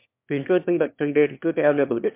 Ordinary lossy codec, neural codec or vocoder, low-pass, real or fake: MP3, 32 kbps; autoencoder, 22.05 kHz, a latent of 192 numbers a frame, VITS, trained on one speaker; 3.6 kHz; fake